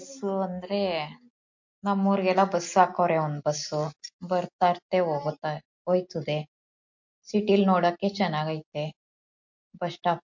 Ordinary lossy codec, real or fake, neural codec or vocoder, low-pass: MP3, 48 kbps; real; none; 7.2 kHz